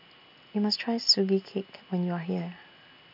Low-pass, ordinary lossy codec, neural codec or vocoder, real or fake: 5.4 kHz; none; none; real